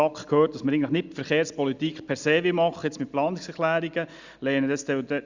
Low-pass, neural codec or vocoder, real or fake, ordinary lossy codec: 7.2 kHz; none; real; Opus, 64 kbps